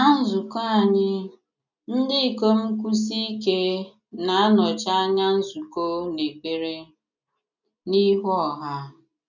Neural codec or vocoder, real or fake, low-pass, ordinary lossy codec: none; real; 7.2 kHz; none